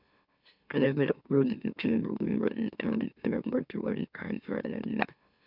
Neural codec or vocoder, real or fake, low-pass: autoencoder, 44.1 kHz, a latent of 192 numbers a frame, MeloTTS; fake; 5.4 kHz